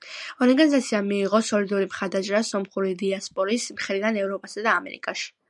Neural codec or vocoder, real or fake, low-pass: none; real; 10.8 kHz